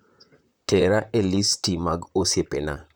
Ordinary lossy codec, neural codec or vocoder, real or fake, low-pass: none; vocoder, 44.1 kHz, 128 mel bands, Pupu-Vocoder; fake; none